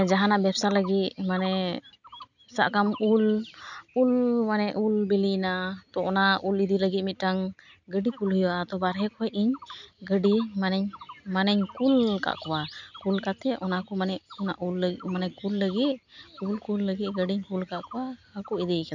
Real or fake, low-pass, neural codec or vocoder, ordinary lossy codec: real; 7.2 kHz; none; none